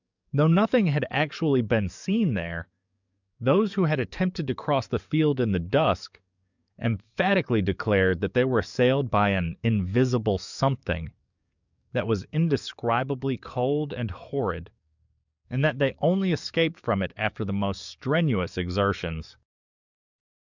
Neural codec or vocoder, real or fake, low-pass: codec, 44.1 kHz, 7.8 kbps, DAC; fake; 7.2 kHz